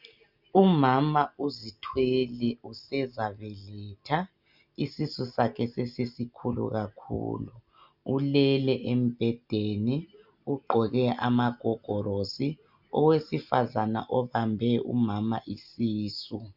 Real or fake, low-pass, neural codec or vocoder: real; 5.4 kHz; none